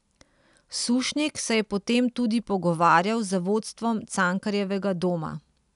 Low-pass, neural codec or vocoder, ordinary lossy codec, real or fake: 10.8 kHz; none; none; real